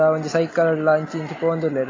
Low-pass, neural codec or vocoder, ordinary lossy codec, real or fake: 7.2 kHz; none; AAC, 32 kbps; real